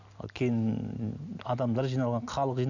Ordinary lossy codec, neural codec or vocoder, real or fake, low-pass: none; none; real; 7.2 kHz